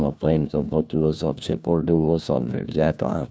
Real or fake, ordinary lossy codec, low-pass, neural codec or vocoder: fake; none; none; codec, 16 kHz, 1 kbps, FunCodec, trained on LibriTTS, 50 frames a second